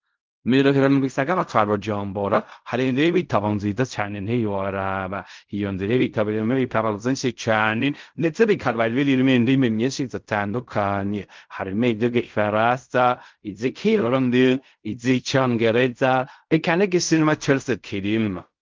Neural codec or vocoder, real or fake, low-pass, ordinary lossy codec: codec, 16 kHz in and 24 kHz out, 0.4 kbps, LongCat-Audio-Codec, fine tuned four codebook decoder; fake; 7.2 kHz; Opus, 24 kbps